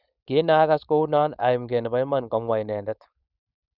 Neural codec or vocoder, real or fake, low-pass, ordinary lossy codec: codec, 16 kHz, 4.8 kbps, FACodec; fake; 5.4 kHz; none